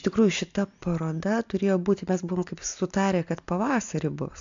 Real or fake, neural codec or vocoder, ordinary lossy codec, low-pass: real; none; MP3, 64 kbps; 7.2 kHz